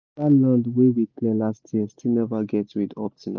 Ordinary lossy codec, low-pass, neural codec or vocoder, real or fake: none; 7.2 kHz; none; real